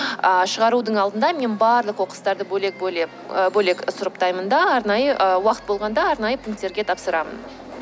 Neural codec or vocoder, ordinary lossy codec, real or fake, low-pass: none; none; real; none